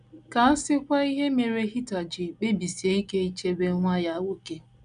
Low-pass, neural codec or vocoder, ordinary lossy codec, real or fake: 9.9 kHz; none; none; real